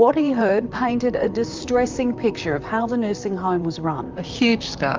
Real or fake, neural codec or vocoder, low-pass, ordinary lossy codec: fake; vocoder, 44.1 kHz, 128 mel bands, Pupu-Vocoder; 7.2 kHz; Opus, 32 kbps